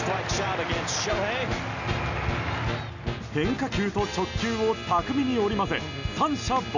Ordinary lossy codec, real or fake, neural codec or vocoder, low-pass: none; real; none; 7.2 kHz